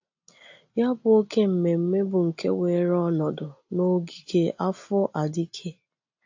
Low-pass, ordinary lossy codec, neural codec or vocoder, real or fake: 7.2 kHz; AAC, 48 kbps; none; real